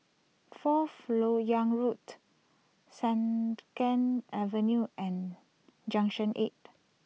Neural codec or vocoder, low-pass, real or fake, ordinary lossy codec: none; none; real; none